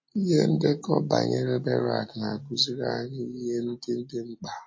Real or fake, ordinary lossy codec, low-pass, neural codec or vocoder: real; MP3, 32 kbps; 7.2 kHz; none